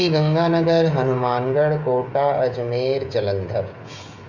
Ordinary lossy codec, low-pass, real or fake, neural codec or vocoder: Opus, 64 kbps; 7.2 kHz; fake; codec, 16 kHz, 16 kbps, FreqCodec, smaller model